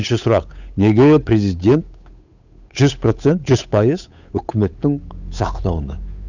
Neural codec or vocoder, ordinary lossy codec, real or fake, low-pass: codec, 16 kHz, 8 kbps, FunCodec, trained on Chinese and English, 25 frames a second; none; fake; 7.2 kHz